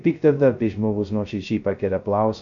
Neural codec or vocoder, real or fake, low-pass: codec, 16 kHz, 0.2 kbps, FocalCodec; fake; 7.2 kHz